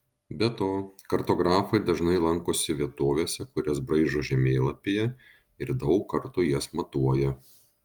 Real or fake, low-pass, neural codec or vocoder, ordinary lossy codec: real; 19.8 kHz; none; Opus, 32 kbps